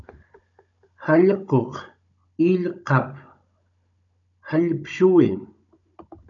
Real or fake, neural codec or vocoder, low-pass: fake; codec, 16 kHz, 16 kbps, FunCodec, trained on Chinese and English, 50 frames a second; 7.2 kHz